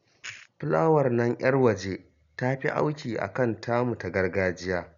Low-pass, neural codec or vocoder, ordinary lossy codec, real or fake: 7.2 kHz; none; none; real